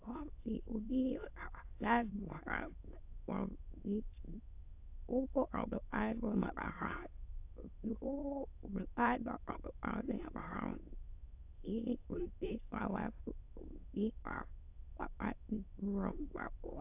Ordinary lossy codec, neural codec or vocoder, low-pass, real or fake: AAC, 32 kbps; autoencoder, 22.05 kHz, a latent of 192 numbers a frame, VITS, trained on many speakers; 3.6 kHz; fake